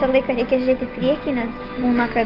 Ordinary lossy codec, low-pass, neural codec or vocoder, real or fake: Opus, 32 kbps; 5.4 kHz; none; real